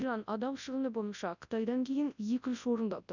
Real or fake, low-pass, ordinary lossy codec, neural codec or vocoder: fake; 7.2 kHz; none; codec, 24 kHz, 0.9 kbps, WavTokenizer, large speech release